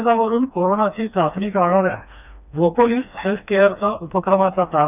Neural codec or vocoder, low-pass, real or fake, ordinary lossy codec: codec, 16 kHz, 2 kbps, FreqCodec, smaller model; 3.6 kHz; fake; none